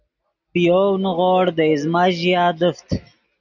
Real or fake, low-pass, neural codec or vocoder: real; 7.2 kHz; none